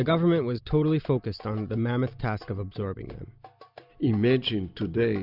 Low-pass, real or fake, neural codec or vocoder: 5.4 kHz; real; none